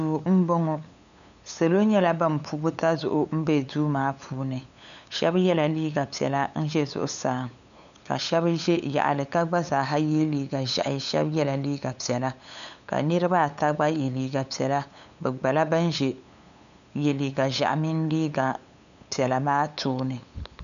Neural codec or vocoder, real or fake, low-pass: codec, 16 kHz, 8 kbps, FunCodec, trained on LibriTTS, 25 frames a second; fake; 7.2 kHz